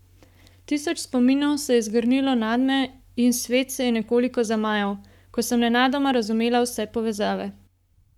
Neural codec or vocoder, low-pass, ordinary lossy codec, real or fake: codec, 44.1 kHz, 7.8 kbps, Pupu-Codec; 19.8 kHz; none; fake